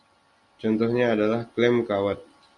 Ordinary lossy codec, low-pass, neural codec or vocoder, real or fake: Opus, 64 kbps; 10.8 kHz; none; real